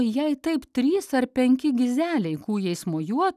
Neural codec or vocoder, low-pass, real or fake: none; 14.4 kHz; real